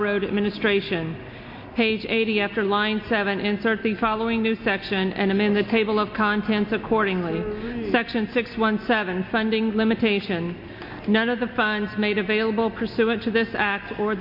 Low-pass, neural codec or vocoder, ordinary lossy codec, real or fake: 5.4 kHz; none; MP3, 32 kbps; real